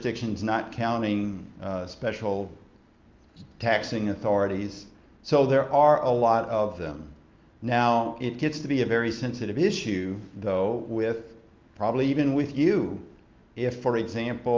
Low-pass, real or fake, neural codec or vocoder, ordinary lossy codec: 7.2 kHz; real; none; Opus, 24 kbps